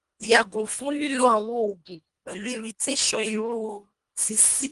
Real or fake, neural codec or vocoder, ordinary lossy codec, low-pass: fake; codec, 24 kHz, 1.5 kbps, HILCodec; Opus, 32 kbps; 10.8 kHz